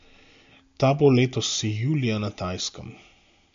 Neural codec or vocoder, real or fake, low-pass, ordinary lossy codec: none; real; 7.2 kHz; MP3, 48 kbps